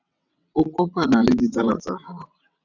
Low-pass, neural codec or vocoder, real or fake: 7.2 kHz; vocoder, 22.05 kHz, 80 mel bands, WaveNeXt; fake